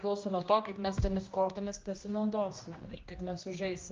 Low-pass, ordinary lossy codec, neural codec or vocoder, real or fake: 7.2 kHz; Opus, 24 kbps; codec, 16 kHz, 1 kbps, X-Codec, HuBERT features, trained on general audio; fake